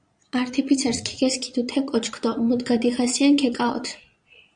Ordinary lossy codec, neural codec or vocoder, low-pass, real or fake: Opus, 64 kbps; vocoder, 22.05 kHz, 80 mel bands, Vocos; 9.9 kHz; fake